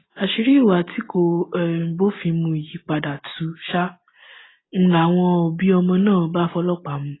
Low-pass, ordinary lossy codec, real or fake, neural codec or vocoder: 7.2 kHz; AAC, 16 kbps; real; none